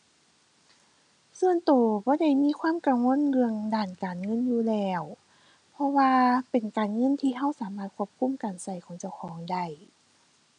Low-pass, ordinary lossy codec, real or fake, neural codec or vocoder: 9.9 kHz; none; real; none